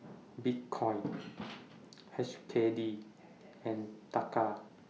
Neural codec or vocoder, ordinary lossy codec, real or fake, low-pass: none; none; real; none